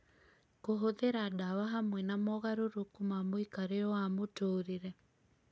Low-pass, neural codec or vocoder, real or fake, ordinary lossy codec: none; none; real; none